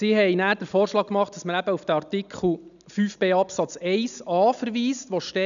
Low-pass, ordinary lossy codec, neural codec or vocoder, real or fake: 7.2 kHz; none; none; real